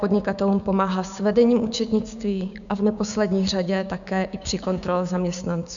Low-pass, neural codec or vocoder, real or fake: 7.2 kHz; codec, 16 kHz, 6 kbps, DAC; fake